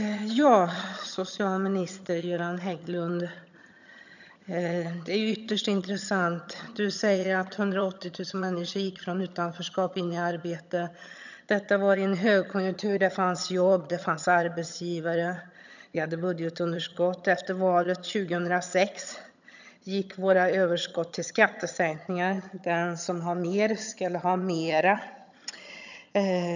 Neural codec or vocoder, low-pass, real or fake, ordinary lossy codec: vocoder, 22.05 kHz, 80 mel bands, HiFi-GAN; 7.2 kHz; fake; none